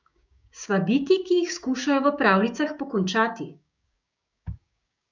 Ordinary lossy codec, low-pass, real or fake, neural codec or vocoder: none; 7.2 kHz; fake; codec, 16 kHz, 6 kbps, DAC